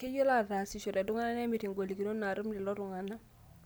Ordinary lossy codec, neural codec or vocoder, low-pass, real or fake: none; none; none; real